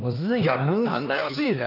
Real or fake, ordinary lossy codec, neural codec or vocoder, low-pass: fake; none; codec, 16 kHz, 2 kbps, X-Codec, HuBERT features, trained on LibriSpeech; 5.4 kHz